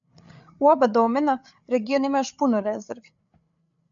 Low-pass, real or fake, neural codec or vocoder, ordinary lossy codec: 7.2 kHz; fake; codec, 16 kHz, 8 kbps, FreqCodec, larger model; MP3, 96 kbps